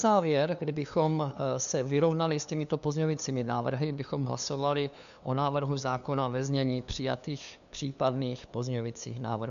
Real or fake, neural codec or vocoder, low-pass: fake; codec, 16 kHz, 2 kbps, FunCodec, trained on LibriTTS, 25 frames a second; 7.2 kHz